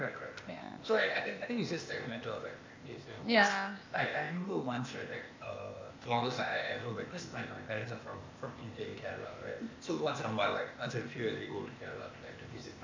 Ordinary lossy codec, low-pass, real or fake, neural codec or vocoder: MP3, 48 kbps; 7.2 kHz; fake; codec, 16 kHz, 0.8 kbps, ZipCodec